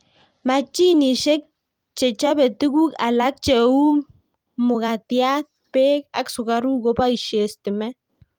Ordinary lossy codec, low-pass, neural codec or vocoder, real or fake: Opus, 32 kbps; 19.8 kHz; vocoder, 44.1 kHz, 128 mel bands every 256 samples, BigVGAN v2; fake